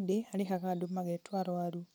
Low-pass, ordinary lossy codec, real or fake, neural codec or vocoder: none; none; real; none